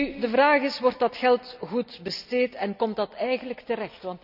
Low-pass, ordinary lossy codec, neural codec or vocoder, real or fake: 5.4 kHz; none; none; real